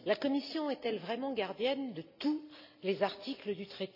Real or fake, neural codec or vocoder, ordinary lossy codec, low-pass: real; none; none; 5.4 kHz